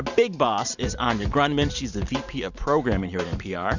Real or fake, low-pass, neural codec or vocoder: fake; 7.2 kHz; vocoder, 44.1 kHz, 128 mel bands every 512 samples, BigVGAN v2